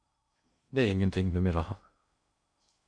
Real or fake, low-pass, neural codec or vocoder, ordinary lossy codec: fake; 9.9 kHz; codec, 16 kHz in and 24 kHz out, 0.6 kbps, FocalCodec, streaming, 2048 codes; MP3, 64 kbps